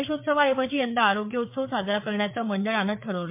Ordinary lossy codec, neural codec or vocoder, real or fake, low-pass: MP3, 32 kbps; codec, 16 kHz, 4 kbps, FreqCodec, larger model; fake; 3.6 kHz